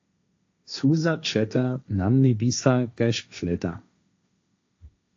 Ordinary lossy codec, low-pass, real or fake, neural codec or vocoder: AAC, 48 kbps; 7.2 kHz; fake; codec, 16 kHz, 1.1 kbps, Voila-Tokenizer